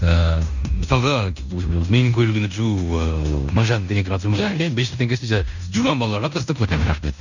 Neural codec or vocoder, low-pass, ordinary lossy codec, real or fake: codec, 16 kHz in and 24 kHz out, 0.9 kbps, LongCat-Audio-Codec, fine tuned four codebook decoder; 7.2 kHz; none; fake